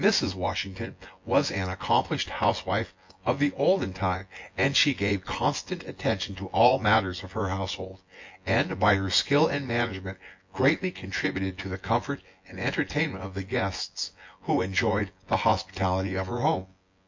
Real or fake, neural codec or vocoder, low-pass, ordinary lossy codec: fake; vocoder, 24 kHz, 100 mel bands, Vocos; 7.2 kHz; MP3, 48 kbps